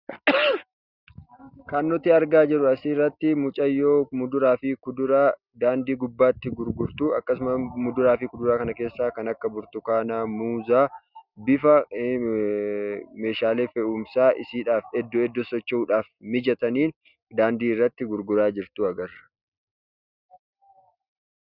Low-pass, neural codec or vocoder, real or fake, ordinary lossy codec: 5.4 kHz; none; real; Opus, 64 kbps